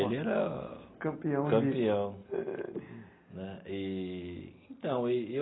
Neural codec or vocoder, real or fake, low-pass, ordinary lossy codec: none; real; 7.2 kHz; AAC, 16 kbps